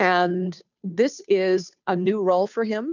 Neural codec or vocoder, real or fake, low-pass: codec, 16 kHz, 2 kbps, FunCodec, trained on Chinese and English, 25 frames a second; fake; 7.2 kHz